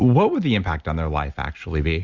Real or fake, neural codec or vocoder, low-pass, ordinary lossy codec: real; none; 7.2 kHz; Opus, 64 kbps